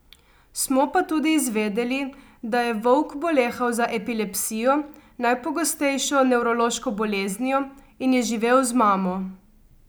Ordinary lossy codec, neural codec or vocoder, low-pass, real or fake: none; none; none; real